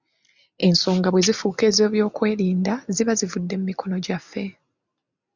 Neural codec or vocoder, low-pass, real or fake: none; 7.2 kHz; real